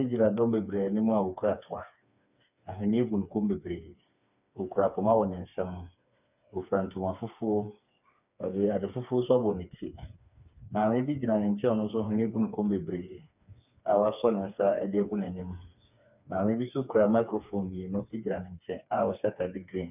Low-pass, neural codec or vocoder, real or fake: 3.6 kHz; codec, 16 kHz, 4 kbps, FreqCodec, smaller model; fake